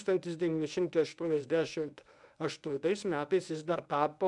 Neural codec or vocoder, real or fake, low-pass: codec, 24 kHz, 0.9 kbps, WavTokenizer, medium speech release version 1; fake; 10.8 kHz